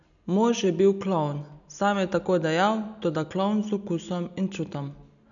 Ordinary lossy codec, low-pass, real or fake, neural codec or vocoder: none; 7.2 kHz; real; none